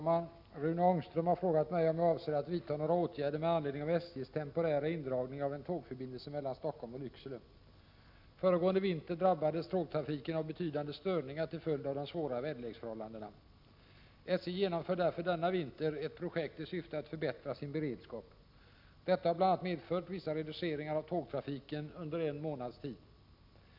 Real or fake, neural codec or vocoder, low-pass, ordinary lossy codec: real; none; 5.4 kHz; MP3, 48 kbps